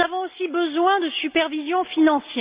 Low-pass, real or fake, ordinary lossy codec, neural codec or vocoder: 3.6 kHz; real; Opus, 64 kbps; none